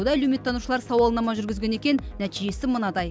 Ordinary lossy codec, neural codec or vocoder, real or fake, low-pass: none; none; real; none